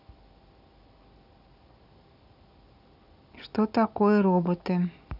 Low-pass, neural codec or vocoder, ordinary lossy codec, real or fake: 5.4 kHz; none; none; real